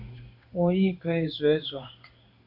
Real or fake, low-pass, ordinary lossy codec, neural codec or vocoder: fake; 5.4 kHz; AAC, 48 kbps; codec, 16 kHz in and 24 kHz out, 1 kbps, XY-Tokenizer